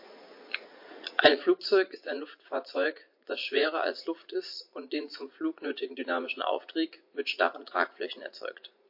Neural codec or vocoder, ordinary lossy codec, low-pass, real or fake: vocoder, 44.1 kHz, 80 mel bands, Vocos; MP3, 32 kbps; 5.4 kHz; fake